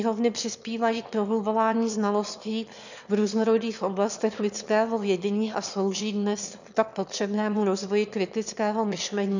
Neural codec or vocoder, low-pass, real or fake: autoencoder, 22.05 kHz, a latent of 192 numbers a frame, VITS, trained on one speaker; 7.2 kHz; fake